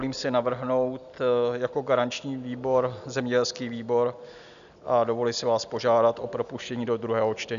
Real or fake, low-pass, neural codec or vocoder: real; 7.2 kHz; none